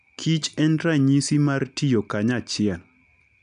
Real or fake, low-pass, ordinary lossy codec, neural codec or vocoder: real; 9.9 kHz; none; none